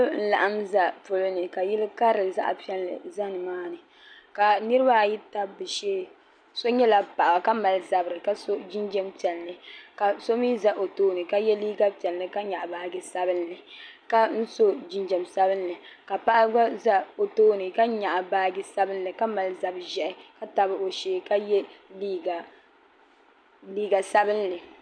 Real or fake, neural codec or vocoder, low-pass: real; none; 9.9 kHz